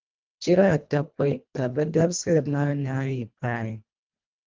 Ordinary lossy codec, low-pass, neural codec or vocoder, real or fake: Opus, 24 kbps; 7.2 kHz; codec, 24 kHz, 1.5 kbps, HILCodec; fake